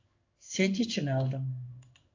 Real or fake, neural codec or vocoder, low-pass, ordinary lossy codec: fake; codec, 16 kHz in and 24 kHz out, 1 kbps, XY-Tokenizer; 7.2 kHz; AAC, 48 kbps